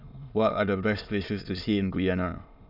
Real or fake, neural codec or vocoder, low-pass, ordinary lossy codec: fake; autoencoder, 22.05 kHz, a latent of 192 numbers a frame, VITS, trained on many speakers; 5.4 kHz; none